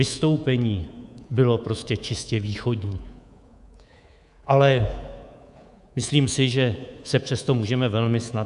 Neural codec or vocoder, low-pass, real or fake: codec, 24 kHz, 3.1 kbps, DualCodec; 10.8 kHz; fake